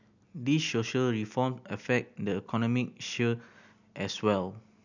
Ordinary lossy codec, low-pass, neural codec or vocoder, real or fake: none; 7.2 kHz; none; real